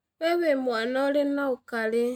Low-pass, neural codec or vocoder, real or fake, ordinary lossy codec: 19.8 kHz; none; real; none